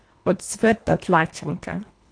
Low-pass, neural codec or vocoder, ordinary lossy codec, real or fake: 9.9 kHz; codec, 24 kHz, 1.5 kbps, HILCodec; AAC, 48 kbps; fake